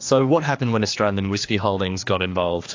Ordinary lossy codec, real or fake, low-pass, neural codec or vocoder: AAC, 48 kbps; fake; 7.2 kHz; codec, 16 kHz, 2 kbps, X-Codec, HuBERT features, trained on general audio